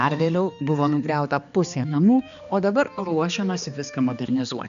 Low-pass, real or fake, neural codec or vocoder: 7.2 kHz; fake; codec, 16 kHz, 2 kbps, X-Codec, HuBERT features, trained on balanced general audio